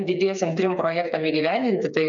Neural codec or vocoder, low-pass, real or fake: codec, 16 kHz, 4 kbps, FreqCodec, smaller model; 7.2 kHz; fake